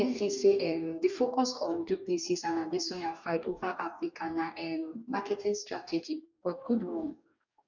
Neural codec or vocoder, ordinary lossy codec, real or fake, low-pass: codec, 44.1 kHz, 2.6 kbps, DAC; none; fake; 7.2 kHz